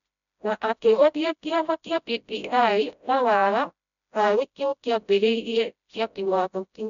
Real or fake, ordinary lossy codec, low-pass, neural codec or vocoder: fake; none; 7.2 kHz; codec, 16 kHz, 0.5 kbps, FreqCodec, smaller model